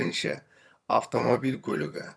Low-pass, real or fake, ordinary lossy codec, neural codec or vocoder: none; fake; none; vocoder, 22.05 kHz, 80 mel bands, HiFi-GAN